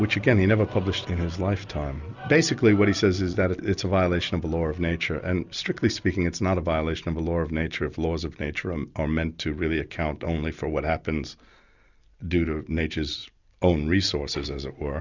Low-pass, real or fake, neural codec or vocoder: 7.2 kHz; real; none